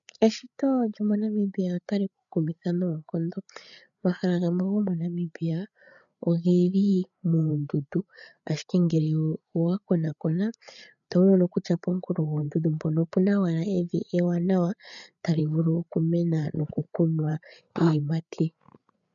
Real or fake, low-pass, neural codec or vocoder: fake; 7.2 kHz; codec, 16 kHz, 8 kbps, FreqCodec, larger model